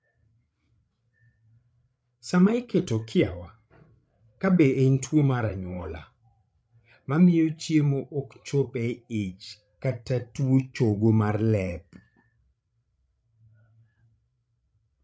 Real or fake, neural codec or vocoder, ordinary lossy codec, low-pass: fake; codec, 16 kHz, 16 kbps, FreqCodec, larger model; none; none